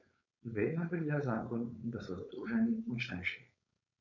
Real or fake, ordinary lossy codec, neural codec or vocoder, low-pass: fake; none; codec, 16 kHz, 4.8 kbps, FACodec; 7.2 kHz